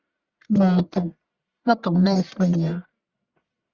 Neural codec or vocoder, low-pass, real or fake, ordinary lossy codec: codec, 44.1 kHz, 1.7 kbps, Pupu-Codec; 7.2 kHz; fake; Opus, 64 kbps